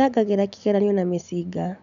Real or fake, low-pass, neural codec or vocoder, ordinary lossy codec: real; 7.2 kHz; none; none